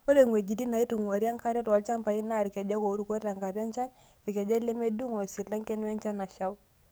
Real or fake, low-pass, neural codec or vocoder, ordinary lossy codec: fake; none; codec, 44.1 kHz, 7.8 kbps, DAC; none